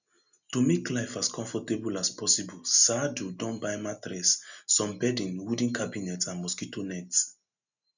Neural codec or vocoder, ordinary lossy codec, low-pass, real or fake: none; none; 7.2 kHz; real